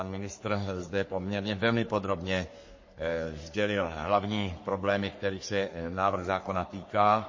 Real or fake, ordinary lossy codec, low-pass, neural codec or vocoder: fake; MP3, 32 kbps; 7.2 kHz; codec, 44.1 kHz, 3.4 kbps, Pupu-Codec